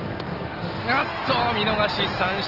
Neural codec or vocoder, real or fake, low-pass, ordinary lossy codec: none; real; 5.4 kHz; Opus, 16 kbps